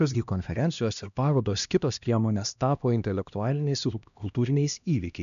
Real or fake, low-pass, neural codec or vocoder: fake; 7.2 kHz; codec, 16 kHz, 1 kbps, X-Codec, HuBERT features, trained on LibriSpeech